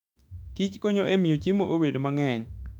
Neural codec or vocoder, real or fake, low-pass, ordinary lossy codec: autoencoder, 48 kHz, 32 numbers a frame, DAC-VAE, trained on Japanese speech; fake; 19.8 kHz; none